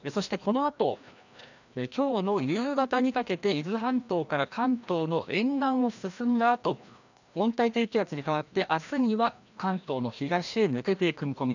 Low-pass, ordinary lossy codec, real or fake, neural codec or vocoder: 7.2 kHz; none; fake; codec, 16 kHz, 1 kbps, FreqCodec, larger model